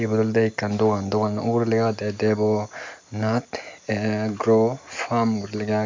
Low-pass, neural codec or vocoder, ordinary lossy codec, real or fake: 7.2 kHz; none; MP3, 64 kbps; real